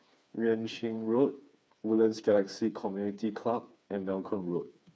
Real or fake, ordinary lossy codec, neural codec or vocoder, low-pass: fake; none; codec, 16 kHz, 4 kbps, FreqCodec, smaller model; none